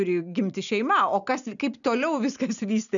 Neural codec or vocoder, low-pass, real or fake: none; 7.2 kHz; real